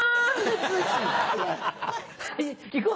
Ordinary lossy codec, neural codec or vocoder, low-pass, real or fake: none; none; none; real